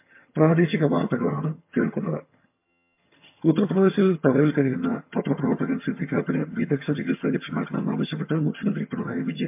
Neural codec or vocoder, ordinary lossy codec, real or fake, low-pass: vocoder, 22.05 kHz, 80 mel bands, HiFi-GAN; MP3, 32 kbps; fake; 3.6 kHz